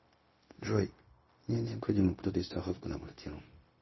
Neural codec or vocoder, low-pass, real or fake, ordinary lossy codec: codec, 16 kHz, 0.4 kbps, LongCat-Audio-Codec; 7.2 kHz; fake; MP3, 24 kbps